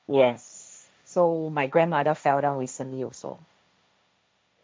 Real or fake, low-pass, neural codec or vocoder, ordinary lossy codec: fake; none; codec, 16 kHz, 1.1 kbps, Voila-Tokenizer; none